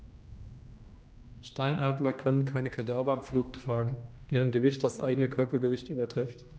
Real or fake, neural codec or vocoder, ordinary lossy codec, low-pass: fake; codec, 16 kHz, 1 kbps, X-Codec, HuBERT features, trained on general audio; none; none